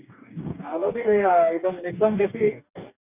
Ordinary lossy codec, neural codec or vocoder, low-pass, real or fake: none; codec, 44.1 kHz, 2.6 kbps, DAC; 3.6 kHz; fake